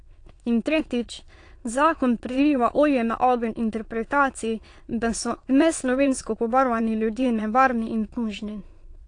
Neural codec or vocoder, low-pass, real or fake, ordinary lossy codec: autoencoder, 22.05 kHz, a latent of 192 numbers a frame, VITS, trained on many speakers; 9.9 kHz; fake; AAC, 48 kbps